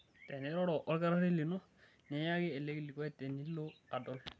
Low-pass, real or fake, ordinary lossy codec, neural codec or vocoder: none; real; none; none